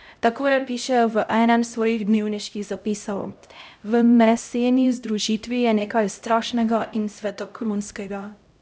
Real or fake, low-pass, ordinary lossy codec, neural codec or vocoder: fake; none; none; codec, 16 kHz, 0.5 kbps, X-Codec, HuBERT features, trained on LibriSpeech